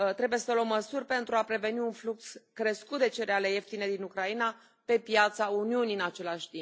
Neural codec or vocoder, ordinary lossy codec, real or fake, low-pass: none; none; real; none